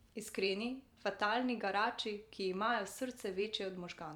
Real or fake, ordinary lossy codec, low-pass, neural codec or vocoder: fake; none; 19.8 kHz; vocoder, 44.1 kHz, 128 mel bands every 512 samples, BigVGAN v2